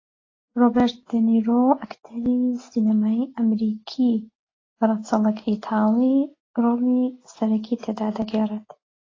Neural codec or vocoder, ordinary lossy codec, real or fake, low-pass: none; AAC, 32 kbps; real; 7.2 kHz